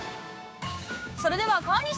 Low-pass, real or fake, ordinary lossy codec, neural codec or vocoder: none; fake; none; codec, 16 kHz, 6 kbps, DAC